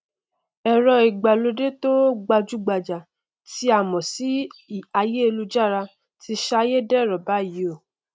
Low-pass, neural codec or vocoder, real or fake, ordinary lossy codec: none; none; real; none